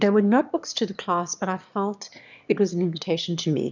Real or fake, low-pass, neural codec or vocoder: fake; 7.2 kHz; autoencoder, 22.05 kHz, a latent of 192 numbers a frame, VITS, trained on one speaker